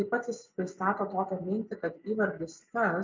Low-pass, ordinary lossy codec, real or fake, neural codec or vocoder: 7.2 kHz; MP3, 64 kbps; real; none